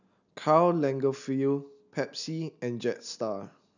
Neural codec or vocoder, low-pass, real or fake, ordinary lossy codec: none; 7.2 kHz; real; none